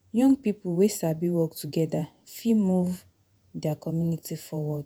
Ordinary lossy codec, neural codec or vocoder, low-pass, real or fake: none; vocoder, 48 kHz, 128 mel bands, Vocos; none; fake